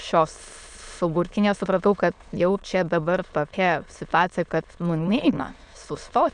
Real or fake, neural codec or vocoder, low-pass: fake; autoencoder, 22.05 kHz, a latent of 192 numbers a frame, VITS, trained on many speakers; 9.9 kHz